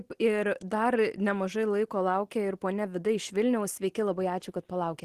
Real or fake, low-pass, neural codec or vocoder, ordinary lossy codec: real; 14.4 kHz; none; Opus, 16 kbps